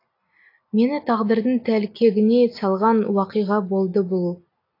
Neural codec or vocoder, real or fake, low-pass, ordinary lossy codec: none; real; 5.4 kHz; AAC, 32 kbps